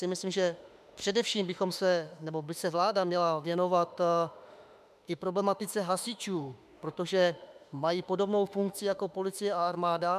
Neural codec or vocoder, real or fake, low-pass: autoencoder, 48 kHz, 32 numbers a frame, DAC-VAE, trained on Japanese speech; fake; 14.4 kHz